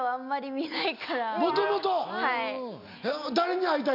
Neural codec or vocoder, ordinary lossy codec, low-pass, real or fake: none; none; 5.4 kHz; real